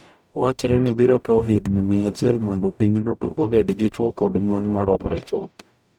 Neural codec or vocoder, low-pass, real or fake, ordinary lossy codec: codec, 44.1 kHz, 0.9 kbps, DAC; 19.8 kHz; fake; none